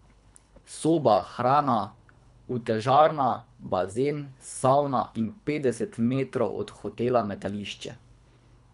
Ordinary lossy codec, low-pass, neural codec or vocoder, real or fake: none; 10.8 kHz; codec, 24 kHz, 3 kbps, HILCodec; fake